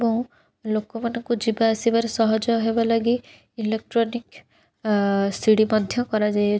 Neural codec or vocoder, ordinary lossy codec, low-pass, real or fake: none; none; none; real